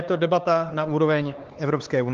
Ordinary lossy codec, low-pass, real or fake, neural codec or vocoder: Opus, 16 kbps; 7.2 kHz; fake; codec, 16 kHz, 4 kbps, X-Codec, HuBERT features, trained on LibriSpeech